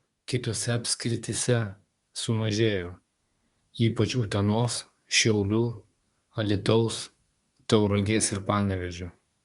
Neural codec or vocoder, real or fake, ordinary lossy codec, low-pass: codec, 24 kHz, 1 kbps, SNAC; fake; Opus, 64 kbps; 10.8 kHz